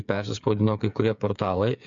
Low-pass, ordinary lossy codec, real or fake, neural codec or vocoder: 7.2 kHz; MP3, 64 kbps; fake; codec, 16 kHz, 8 kbps, FreqCodec, smaller model